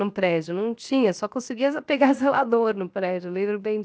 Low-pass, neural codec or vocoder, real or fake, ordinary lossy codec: none; codec, 16 kHz, 0.7 kbps, FocalCodec; fake; none